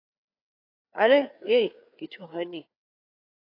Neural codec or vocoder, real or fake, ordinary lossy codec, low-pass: codec, 16 kHz, 4 kbps, FreqCodec, larger model; fake; AAC, 32 kbps; 5.4 kHz